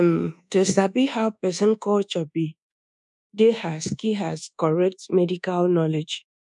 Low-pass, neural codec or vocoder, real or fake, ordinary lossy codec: 10.8 kHz; codec, 24 kHz, 1.2 kbps, DualCodec; fake; none